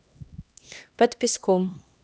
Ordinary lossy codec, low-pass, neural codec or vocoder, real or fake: none; none; codec, 16 kHz, 1 kbps, X-Codec, HuBERT features, trained on LibriSpeech; fake